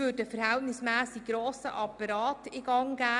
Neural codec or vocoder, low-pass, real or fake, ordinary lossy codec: none; 10.8 kHz; real; none